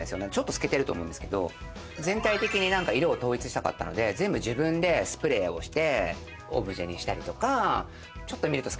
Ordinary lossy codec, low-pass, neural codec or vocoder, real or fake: none; none; none; real